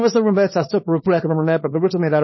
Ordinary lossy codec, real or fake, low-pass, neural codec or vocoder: MP3, 24 kbps; fake; 7.2 kHz; codec, 24 kHz, 0.9 kbps, WavTokenizer, small release